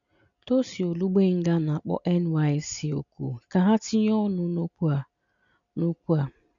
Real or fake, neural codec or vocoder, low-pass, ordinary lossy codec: real; none; 7.2 kHz; none